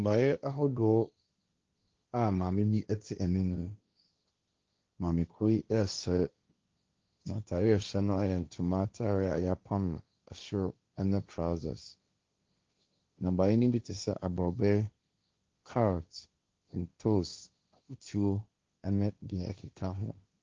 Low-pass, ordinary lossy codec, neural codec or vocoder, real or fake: 7.2 kHz; Opus, 24 kbps; codec, 16 kHz, 1.1 kbps, Voila-Tokenizer; fake